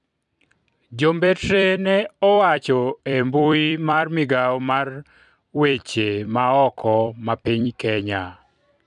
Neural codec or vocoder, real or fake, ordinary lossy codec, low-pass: vocoder, 44.1 kHz, 128 mel bands every 256 samples, BigVGAN v2; fake; none; 10.8 kHz